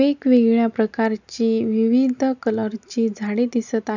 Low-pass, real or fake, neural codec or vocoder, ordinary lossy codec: 7.2 kHz; real; none; none